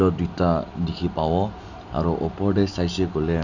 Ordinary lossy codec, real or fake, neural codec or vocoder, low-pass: none; real; none; 7.2 kHz